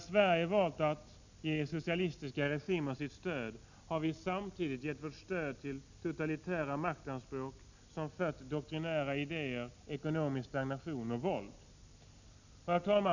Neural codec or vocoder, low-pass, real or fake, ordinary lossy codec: none; 7.2 kHz; real; none